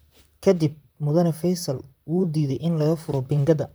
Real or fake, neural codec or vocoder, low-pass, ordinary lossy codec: fake; vocoder, 44.1 kHz, 128 mel bands, Pupu-Vocoder; none; none